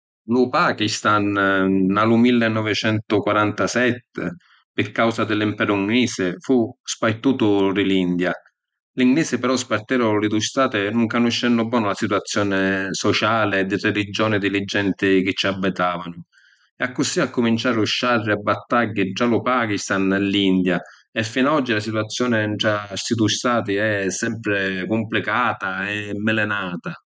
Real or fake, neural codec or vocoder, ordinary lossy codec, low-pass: real; none; none; none